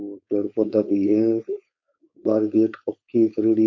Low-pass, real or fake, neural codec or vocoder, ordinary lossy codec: 7.2 kHz; fake; codec, 16 kHz, 4.8 kbps, FACodec; MP3, 48 kbps